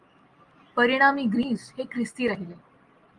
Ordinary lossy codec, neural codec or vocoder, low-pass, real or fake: Opus, 32 kbps; none; 10.8 kHz; real